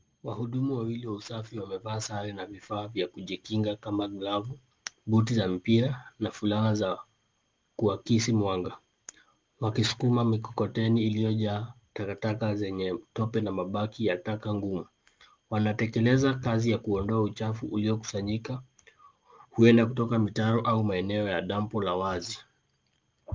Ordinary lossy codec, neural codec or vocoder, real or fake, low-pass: Opus, 24 kbps; none; real; 7.2 kHz